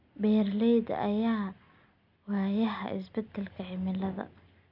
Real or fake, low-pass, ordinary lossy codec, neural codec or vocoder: real; 5.4 kHz; none; none